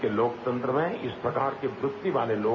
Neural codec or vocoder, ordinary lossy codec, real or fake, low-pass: none; none; real; 7.2 kHz